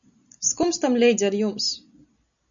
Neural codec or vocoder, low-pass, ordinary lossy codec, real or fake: none; 7.2 kHz; MP3, 96 kbps; real